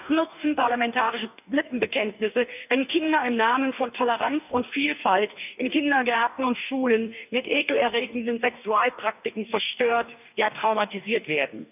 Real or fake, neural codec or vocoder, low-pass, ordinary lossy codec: fake; codec, 44.1 kHz, 2.6 kbps, DAC; 3.6 kHz; none